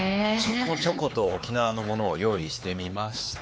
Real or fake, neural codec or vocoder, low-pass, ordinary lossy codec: fake; codec, 16 kHz, 4 kbps, X-Codec, HuBERT features, trained on LibriSpeech; none; none